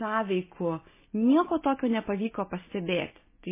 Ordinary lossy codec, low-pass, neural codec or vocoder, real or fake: MP3, 16 kbps; 3.6 kHz; none; real